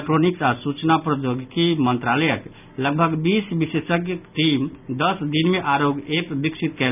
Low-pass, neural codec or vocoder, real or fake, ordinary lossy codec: 3.6 kHz; none; real; none